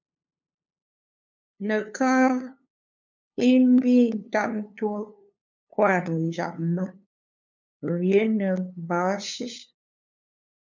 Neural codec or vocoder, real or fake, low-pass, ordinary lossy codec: codec, 16 kHz, 2 kbps, FunCodec, trained on LibriTTS, 25 frames a second; fake; 7.2 kHz; MP3, 64 kbps